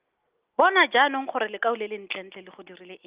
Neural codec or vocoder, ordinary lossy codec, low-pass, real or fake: none; Opus, 32 kbps; 3.6 kHz; real